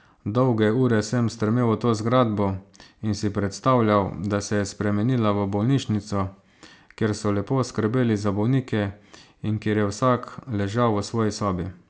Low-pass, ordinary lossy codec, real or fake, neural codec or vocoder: none; none; real; none